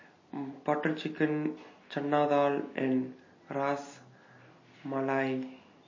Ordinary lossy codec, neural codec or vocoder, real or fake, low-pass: MP3, 32 kbps; none; real; 7.2 kHz